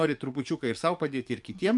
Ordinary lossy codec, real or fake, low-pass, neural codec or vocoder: MP3, 64 kbps; fake; 10.8 kHz; codec, 44.1 kHz, 7.8 kbps, DAC